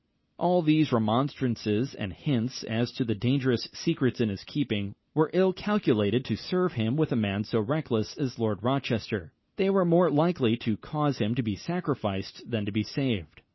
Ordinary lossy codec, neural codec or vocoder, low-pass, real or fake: MP3, 24 kbps; none; 7.2 kHz; real